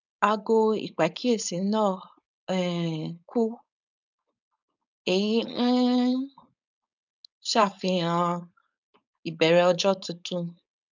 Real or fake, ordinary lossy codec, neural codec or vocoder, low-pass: fake; none; codec, 16 kHz, 4.8 kbps, FACodec; 7.2 kHz